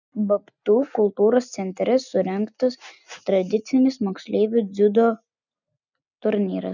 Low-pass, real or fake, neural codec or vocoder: 7.2 kHz; real; none